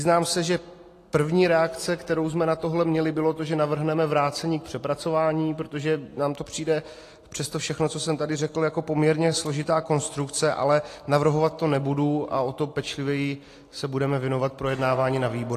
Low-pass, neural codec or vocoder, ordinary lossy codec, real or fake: 14.4 kHz; none; AAC, 48 kbps; real